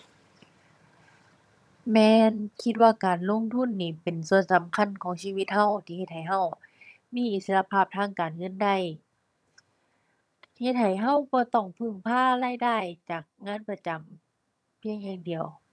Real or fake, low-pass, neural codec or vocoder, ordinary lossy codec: fake; none; vocoder, 22.05 kHz, 80 mel bands, HiFi-GAN; none